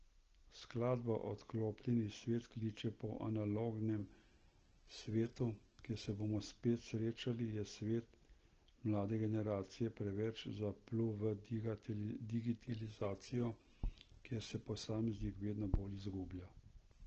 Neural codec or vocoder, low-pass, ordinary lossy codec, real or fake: none; 7.2 kHz; Opus, 16 kbps; real